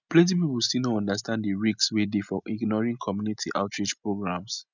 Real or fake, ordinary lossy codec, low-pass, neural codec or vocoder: real; none; 7.2 kHz; none